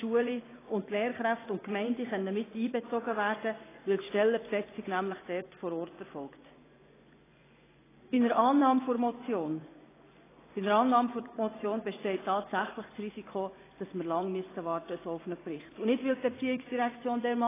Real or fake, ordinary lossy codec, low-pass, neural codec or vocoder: fake; AAC, 16 kbps; 3.6 kHz; vocoder, 24 kHz, 100 mel bands, Vocos